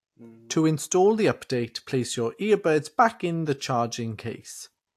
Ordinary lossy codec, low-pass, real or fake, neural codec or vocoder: AAC, 64 kbps; 14.4 kHz; real; none